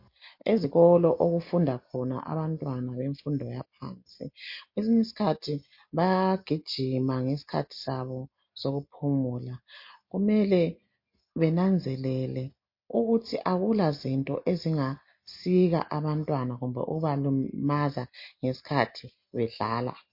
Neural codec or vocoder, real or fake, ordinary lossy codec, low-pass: none; real; MP3, 32 kbps; 5.4 kHz